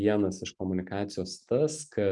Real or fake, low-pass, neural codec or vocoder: real; 10.8 kHz; none